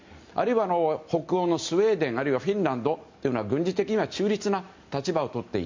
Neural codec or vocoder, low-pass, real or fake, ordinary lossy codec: none; 7.2 kHz; real; MP3, 48 kbps